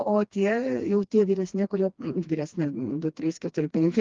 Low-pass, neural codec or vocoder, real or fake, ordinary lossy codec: 7.2 kHz; codec, 16 kHz, 2 kbps, FreqCodec, smaller model; fake; Opus, 32 kbps